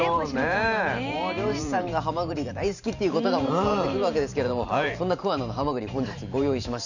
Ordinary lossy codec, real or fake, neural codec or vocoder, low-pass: none; fake; vocoder, 44.1 kHz, 128 mel bands every 512 samples, BigVGAN v2; 7.2 kHz